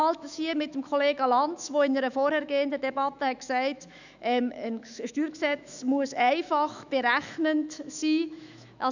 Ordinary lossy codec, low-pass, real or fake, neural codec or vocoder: none; 7.2 kHz; fake; autoencoder, 48 kHz, 128 numbers a frame, DAC-VAE, trained on Japanese speech